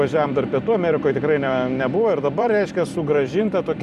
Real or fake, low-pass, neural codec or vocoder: fake; 14.4 kHz; vocoder, 44.1 kHz, 128 mel bands every 256 samples, BigVGAN v2